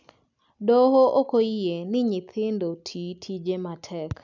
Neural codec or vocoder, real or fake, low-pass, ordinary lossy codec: none; real; 7.2 kHz; none